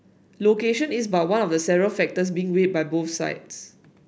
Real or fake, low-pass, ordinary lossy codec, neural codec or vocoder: real; none; none; none